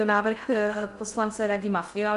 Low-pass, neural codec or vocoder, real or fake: 10.8 kHz; codec, 16 kHz in and 24 kHz out, 0.6 kbps, FocalCodec, streaming, 2048 codes; fake